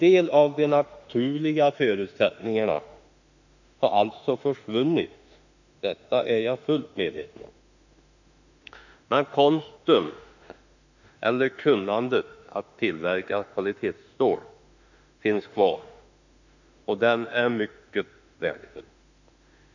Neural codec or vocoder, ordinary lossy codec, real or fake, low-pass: autoencoder, 48 kHz, 32 numbers a frame, DAC-VAE, trained on Japanese speech; none; fake; 7.2 kHz